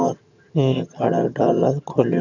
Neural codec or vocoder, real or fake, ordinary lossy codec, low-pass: vocoder, 22.05 kHz, 80 mel bands, HiFi-GAN; fake; none; 7.2 kHz